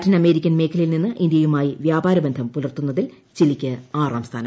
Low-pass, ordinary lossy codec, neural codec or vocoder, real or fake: none; none; none; real